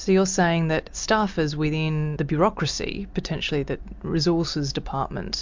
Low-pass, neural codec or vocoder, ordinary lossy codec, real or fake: 7.2 kHz; none; MP3, 64 kbps; real